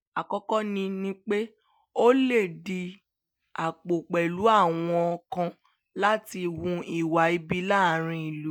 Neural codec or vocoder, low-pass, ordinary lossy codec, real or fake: none; none; none; real